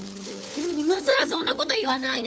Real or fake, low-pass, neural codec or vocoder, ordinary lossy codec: fake; none; codec, 16 kHz, 4 kbps, FunCodec, trained on LibriTTS, 50 frames a second; none